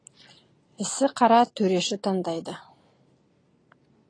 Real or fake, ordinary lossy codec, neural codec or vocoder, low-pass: real; AAC, 32 kbps; none; 9.9 kHz